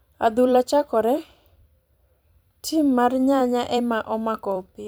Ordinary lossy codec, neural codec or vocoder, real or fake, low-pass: none; vocoder, 44.1 kHz, 128 mel bands every 256 samples, BigVGAN v2; fake; none